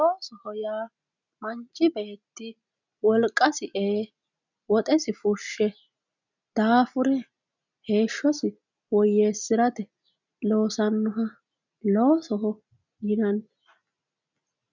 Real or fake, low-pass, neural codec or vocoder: real; 7.2 kHz; none